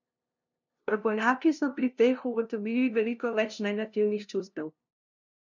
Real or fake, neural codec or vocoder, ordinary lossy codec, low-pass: fake; codec, 16 kHz, 0.5 kbps, FunCodec, trained on LibriTTS, 25 frames a second; none; 7.2 kHz